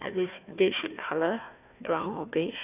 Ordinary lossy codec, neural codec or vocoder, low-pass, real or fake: none; codec, 16 kHz, 1 kbps, FunCodec, trained on Chinese and English, 50 frames a second; 3.6 kHz; fake